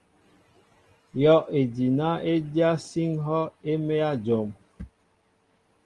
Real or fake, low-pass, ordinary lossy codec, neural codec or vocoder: real; 10.8 kHz; Opus, 24 kbps; none